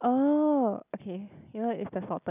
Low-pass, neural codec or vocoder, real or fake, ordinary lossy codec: 3.6 kHz; none; real; none